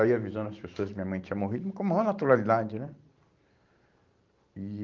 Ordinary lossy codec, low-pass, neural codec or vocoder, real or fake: Opus, 32 kbps; 7.2 kHz; vocoder, 44.1 kHz, 128 mel bands every 512 samples, BigVGAN v2; fake